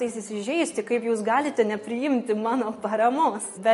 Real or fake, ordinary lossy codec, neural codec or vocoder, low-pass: real; MP3, 48 kbps; none; 14.4 kHz